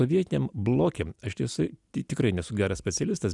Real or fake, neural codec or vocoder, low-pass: real; none; 10.8 kHz